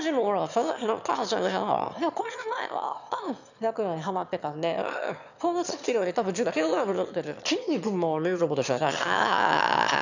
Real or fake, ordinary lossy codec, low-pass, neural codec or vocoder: fake; none; 7.2 kHz; autoencoder, 22.05 kHz, a latent of 192 numbers a frame, VITS, trained on one speaker